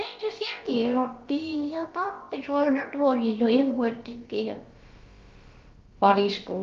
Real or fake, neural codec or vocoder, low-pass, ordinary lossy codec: fake; codec, 16 kHz, about 1 kbps, DyCAST, with the encoder's durations; 7.2 kHz; Opus, 32 kbps